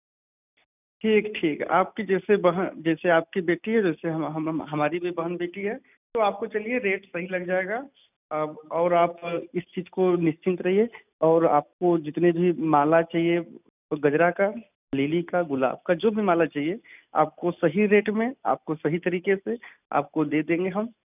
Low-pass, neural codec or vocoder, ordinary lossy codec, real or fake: 3.6 kHz; none; none; real